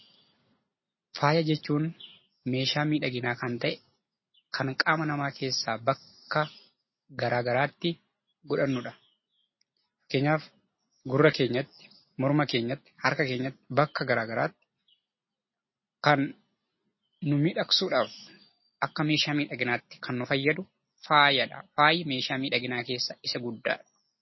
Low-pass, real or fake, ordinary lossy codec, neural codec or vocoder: 7.2 kHz; real; MP3, 24 kbps; none